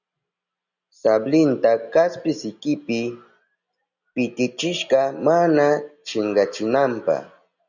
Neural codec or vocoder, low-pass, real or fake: none; 7.2 kHz; real